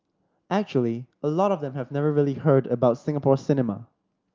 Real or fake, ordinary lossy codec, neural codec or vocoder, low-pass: real; Opus, 32 kbps; none; 7.2 kHz